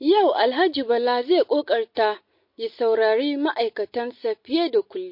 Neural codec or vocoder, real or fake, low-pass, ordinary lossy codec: none; real; 5.4 kHz; MP3, 32 kbps